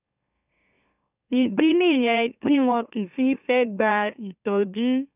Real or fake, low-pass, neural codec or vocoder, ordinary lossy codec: fake; 3.6 kHz; autoencoder, 44.1 kHz, a latent of 192 numbers a frame, MeloTTS; none